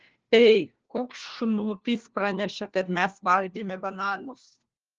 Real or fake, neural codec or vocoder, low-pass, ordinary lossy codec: fake; codec, 16 kHz, 1 kbps, FunCodec, trained on LibriTTS, 50 frames a second; 7.2 kHz; Opus, 16 kbps